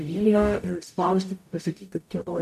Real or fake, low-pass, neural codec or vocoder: fake; 14.4 kHz; codec, 44.1 kHz, 0.9 kbps, DAC